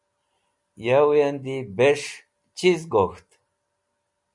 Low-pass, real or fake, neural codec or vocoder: 10.8 kHz; real; none